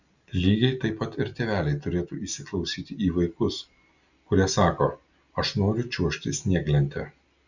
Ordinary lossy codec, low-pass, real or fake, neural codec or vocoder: Opus, 64 kbps; 7.2 kHz; real; none